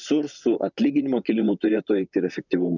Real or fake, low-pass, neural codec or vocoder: fake; 7.2 kHz; vocoder, 44.1 kHz, 128 mel bands, Pupu-Vocoder